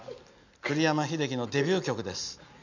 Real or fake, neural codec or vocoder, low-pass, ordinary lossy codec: real; none; 7.2 kHz; none